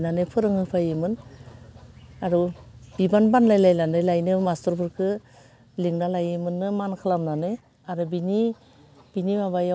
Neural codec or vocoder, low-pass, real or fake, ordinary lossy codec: none; none; real; none